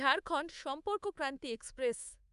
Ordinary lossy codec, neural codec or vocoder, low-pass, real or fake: none; codec, 24 kHz, 3.1 kbps, DualCodec; 10.8 kHz; fake